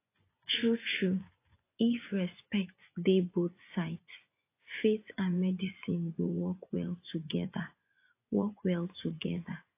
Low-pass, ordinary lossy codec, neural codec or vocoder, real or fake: 3.6 kHz; AAC, 24 kbps; vocoder, 24 kHz, 100 mel bands, Vocos; fake